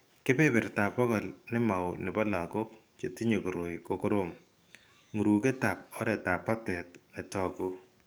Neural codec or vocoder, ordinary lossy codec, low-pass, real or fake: codec, 44.1 kHz, 7.8 kbps, DAC; none; none; fake